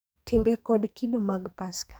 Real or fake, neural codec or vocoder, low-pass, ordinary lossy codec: fake; codec, 44.1 kHz, 2.6 kbps, SNAC; none; none